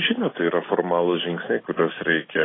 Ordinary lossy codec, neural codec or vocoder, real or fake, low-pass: AAC, 16 kbps; none; real; 7.2 kHz